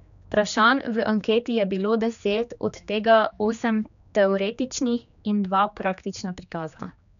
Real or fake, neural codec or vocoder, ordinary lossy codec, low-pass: fake; codec, 16 kHz, 2 kbps, X-Codec, HuBERT features, trained on general audio; none; 7.2 kHz